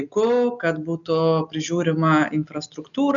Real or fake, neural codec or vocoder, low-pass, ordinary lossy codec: real; none; 7.2 kHz; MP3, 96 kbps